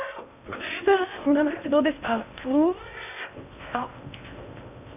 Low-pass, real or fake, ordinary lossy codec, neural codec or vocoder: 3.6 kHz; fake; none; codec, 16 kHz in and 24 kHz out, 0.6 kbps, FocalCodec, streaming, 2048 codes